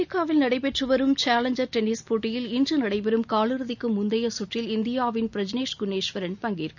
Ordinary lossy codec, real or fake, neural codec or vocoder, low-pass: none; real; none; 7.2 kHz